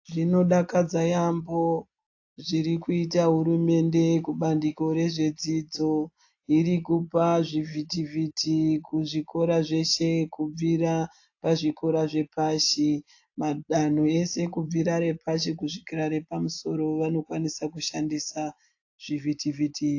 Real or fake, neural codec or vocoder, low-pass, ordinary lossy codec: real; none; 7.2 kHz; AAC, 48 kbps